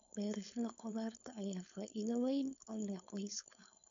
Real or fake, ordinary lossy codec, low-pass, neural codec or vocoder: fake; MP3, 64 kbps; 7.2 kHz; codec, 16 kHz, 4.8 kbps, FACodec